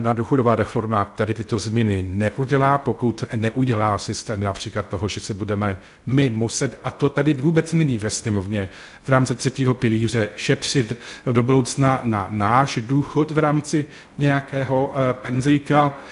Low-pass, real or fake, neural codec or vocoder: 10.8 kHz; fake; codec, 16 kHz in and 24 kHz out, 0.6 kbps, FocalCodec, streaming, 2048 codes